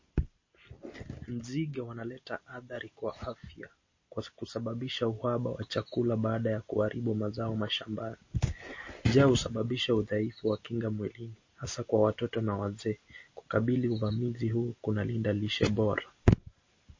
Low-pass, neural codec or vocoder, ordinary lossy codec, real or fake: 7.2 kHz; none; MP3, 32 kbps; real